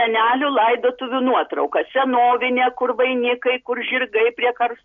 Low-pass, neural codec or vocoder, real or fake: 7.2 kHz; none; real